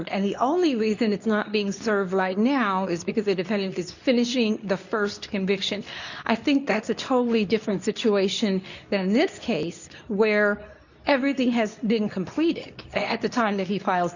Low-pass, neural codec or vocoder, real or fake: 7.2 kHz; codec, 24 kHz, 0.9 kbps, WavTokenizer, medium speech release version 2; fake